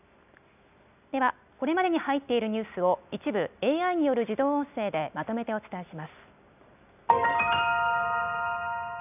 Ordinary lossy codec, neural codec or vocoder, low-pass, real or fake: none; none; 3.6 kHz; real